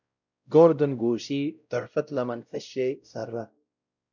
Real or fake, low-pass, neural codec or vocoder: fake; 7.2 kHz; codec, 16 kHz, 0.5 kbps, X-Codec, WavLM features, trained on Multilingual LibriSpeech